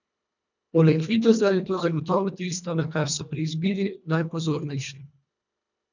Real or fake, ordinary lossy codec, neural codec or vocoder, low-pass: fake; none; codec, 24 kHz, 1.5 kbps, HILCodec; 7.2 kHz